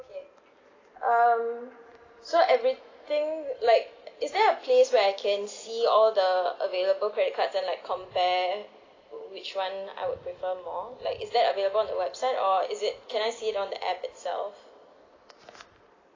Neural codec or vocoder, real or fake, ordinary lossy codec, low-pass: none; real; AAC, 32 kbps; 7.2 kHz